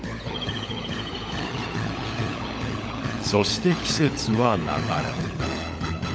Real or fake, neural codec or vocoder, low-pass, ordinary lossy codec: fake; codec, 16 kHz, 16 kbps, FunCodec, trained on LibriTTS, 50 frames a second; none; none